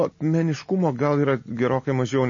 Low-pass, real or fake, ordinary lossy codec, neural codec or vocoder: 7.2 kHz; real; MP3, 32 kbps; none